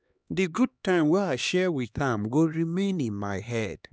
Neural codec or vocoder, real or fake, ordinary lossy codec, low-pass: codec, 16 kHz, 2 kbps, X-Codec, HuBERT features, trained on LibriSpeech; fake; none; none